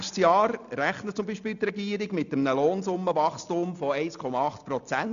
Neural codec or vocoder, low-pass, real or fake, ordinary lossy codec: none; 7.2 kHz; real; none